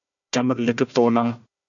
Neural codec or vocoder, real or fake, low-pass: codec, 16 kHz, 1 kbps, FunCodec, trained on Chinese and English, 50 frames a second; fake; 7.2 kHz